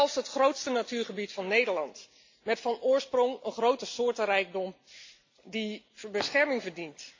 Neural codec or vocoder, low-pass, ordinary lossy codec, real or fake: none; 7.2 kHz; MP3, 48 kbps; real